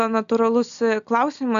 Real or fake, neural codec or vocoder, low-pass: real; none; 7.2 kHz